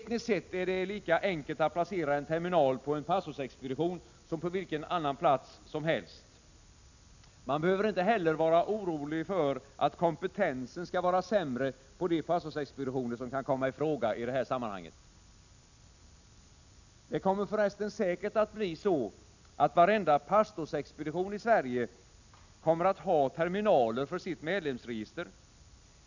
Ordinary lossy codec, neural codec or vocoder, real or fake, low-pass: none; none; real; 7.2 kHz